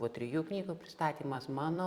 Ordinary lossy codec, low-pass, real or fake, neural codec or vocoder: Opus, 64 kbps; 19.8 kHz; fake; vocoder, 44.1 kHz, 128 mel bands every 256 samples, BigVGAN v2